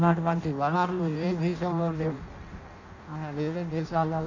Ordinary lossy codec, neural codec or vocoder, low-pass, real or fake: none; codec, 16 kHz in and 24 kHz out, 0.6 kbps, FireRedTTS-2 codec; 7.2 kHz; fake